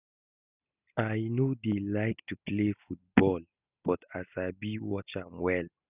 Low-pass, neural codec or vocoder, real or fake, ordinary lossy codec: 3.6 kHz; none; real; none